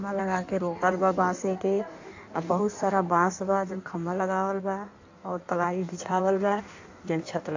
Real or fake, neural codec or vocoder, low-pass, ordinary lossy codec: fake; codec, 16 kHz in and 24 kHz out, 1.1 kbps, FireRedTTS-2 codec; 7.2 kHz; AAC, 48 kbps